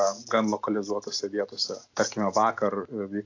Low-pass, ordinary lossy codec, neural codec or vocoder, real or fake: 7.2 kHz; AAC, 48 kbps; none; real